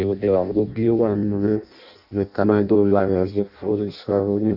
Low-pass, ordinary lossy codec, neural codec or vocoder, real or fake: 5.4 kHz; none; codec, 16 kHz in and 24 kHz out, 0.6 kbps, FireRedTTS-2 codec; fake